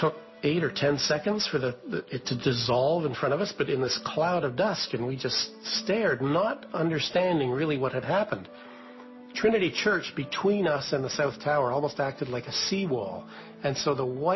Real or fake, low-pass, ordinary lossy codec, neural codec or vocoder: real; 7.2 kHz; MP3, 24 kbps; none